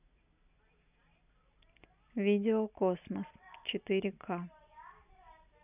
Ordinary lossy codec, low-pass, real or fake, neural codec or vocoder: none; 3.6 kHz; real; none